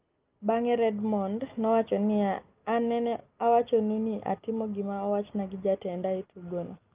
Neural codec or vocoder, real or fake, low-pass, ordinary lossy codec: none; real; 3.6 kHz; Opus, 32 kbps